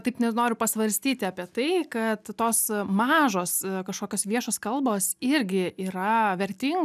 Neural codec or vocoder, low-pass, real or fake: none; 14.4 kHz; real